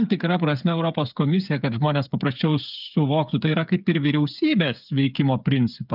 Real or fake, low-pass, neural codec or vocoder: fake; 5.4 kHz; codec, 16 kHz, 8 kbps, FreqCodec, smaller model